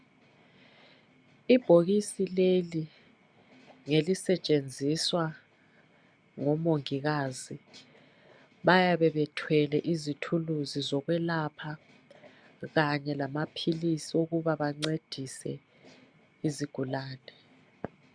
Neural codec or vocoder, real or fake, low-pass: none; real; 9.9 kHz